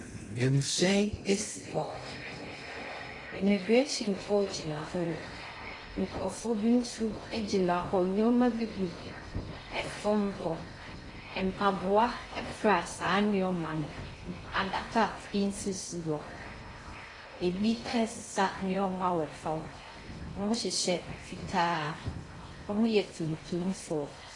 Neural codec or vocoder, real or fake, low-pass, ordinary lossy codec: codec, 16 kHz in and 24 kHz out, 0.6 kbps, FocalCodec, streaming, 2048 codes; fake; 10.8 kHz; AAC, 32 kbps